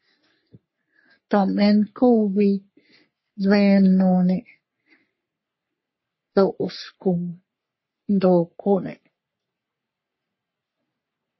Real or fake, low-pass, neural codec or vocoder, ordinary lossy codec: fake; 7.2 kHz; codec, 44.1 kHz, 3.4 kbps, Pupu-Codec; MP3, 24 kbps